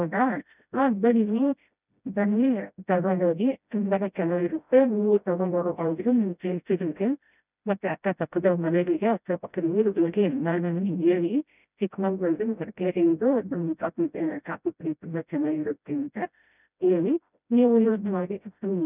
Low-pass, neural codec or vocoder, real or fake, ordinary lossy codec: 3.6 kHz; codec, 16 kHz, 0.5 kbps, FreqCodec, smaller model; fake; none